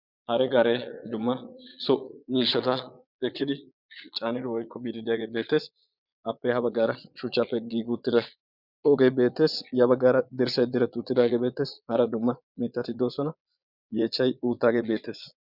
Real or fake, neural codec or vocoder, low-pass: fake; vocoder, 22.05 kHz, 80 mel bands, Vocos; 5.4 kHz